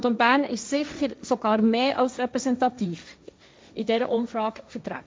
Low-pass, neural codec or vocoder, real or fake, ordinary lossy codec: none; codec, 16 kHz, 1.1 kbps, Voila-Tokenizer; fake; none